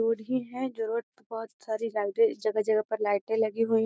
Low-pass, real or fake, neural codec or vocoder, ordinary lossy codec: none; real; none; none